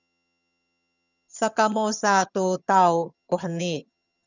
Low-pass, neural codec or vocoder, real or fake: 7.2 kHz; vocoder, 22.05 kHz, 80 mel bands, HiFi-GAN; fake